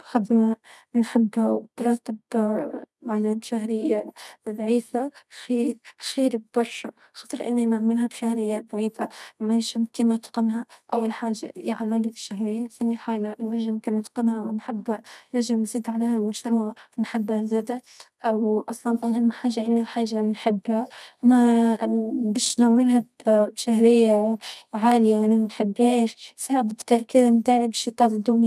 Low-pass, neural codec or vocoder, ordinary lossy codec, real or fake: none; codec, 24 kHz, 0.9 kbps, WavTokenizer, medium music audio release; none; fake